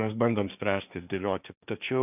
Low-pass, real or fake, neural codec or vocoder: 3.6 kHz; fake; codec, 16 kHz, 1.1 kbps, Voila-Tokenizer